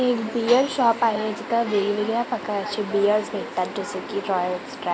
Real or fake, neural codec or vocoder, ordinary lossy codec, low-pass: real; none; none; none